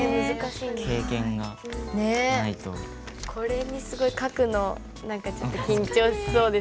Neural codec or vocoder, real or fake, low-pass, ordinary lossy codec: none; real; none; none